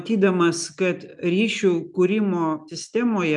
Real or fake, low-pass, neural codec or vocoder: real; 10.8 kHz; none